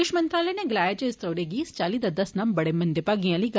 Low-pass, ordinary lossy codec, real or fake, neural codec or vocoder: none; none; real; none